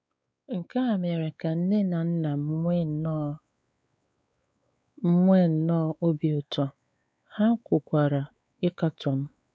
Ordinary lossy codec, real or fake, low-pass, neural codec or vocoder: none; fake; none; codec, 16 kHz, 4 kbps, X-Codec, WavLM features, trained on Multilingual LibriSpeech